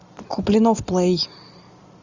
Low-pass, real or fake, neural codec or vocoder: 7.2 kHz; real; none